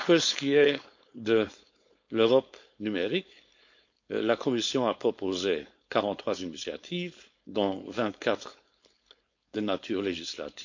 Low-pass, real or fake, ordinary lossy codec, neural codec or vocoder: 7.2 kHz; fake; MP3, 48 kbps; codec, 16 kHz, 4.8 kbps, FACodec